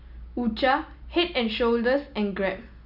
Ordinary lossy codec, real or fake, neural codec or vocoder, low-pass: none; real; none; 5.4 kHz